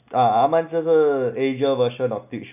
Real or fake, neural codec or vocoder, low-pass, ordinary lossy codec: real; none; 3.6 kHz; none